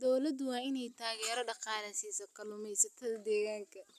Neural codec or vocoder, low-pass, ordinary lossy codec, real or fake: none; 14.4 kHz; none; real